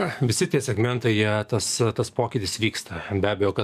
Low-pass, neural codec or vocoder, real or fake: 14.4 kHz; codec, 44.1 kHz, 7.8 kbps, DAC; fake